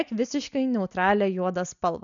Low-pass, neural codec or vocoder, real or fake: 7.2 kHz; none; real